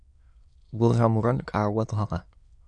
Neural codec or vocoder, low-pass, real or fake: autoencoder, 22.05 kHz, a latent of 192 numbers a frame, VITS, trained on many speakers; 9.9 kHz; fake